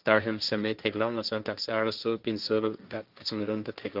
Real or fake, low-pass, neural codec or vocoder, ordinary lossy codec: fake; 5.4 kHz; codec, 16 kHz, 1.1 kbps, Voila-Tokenizer; Opus, 24 kbps